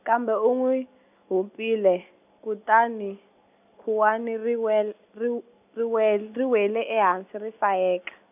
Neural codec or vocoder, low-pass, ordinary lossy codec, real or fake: none; 3.6 kHz; none; real